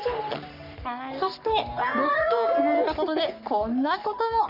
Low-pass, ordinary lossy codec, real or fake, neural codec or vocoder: 5.4 kHz; none; fake; codec, 44.1 kHz, 3.4 kbps, Pupu-Codec